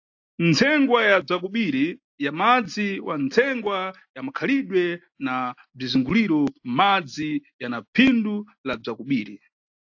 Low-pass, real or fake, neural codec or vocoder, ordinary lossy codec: 7.2 kHz; real; none; AAC, 48 kbps